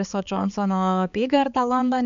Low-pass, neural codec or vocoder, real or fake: 7.2 kHz; codec, 16 kHz, 4 kbps, X-Codec, HuBERT features, trained on balanced general audio; fake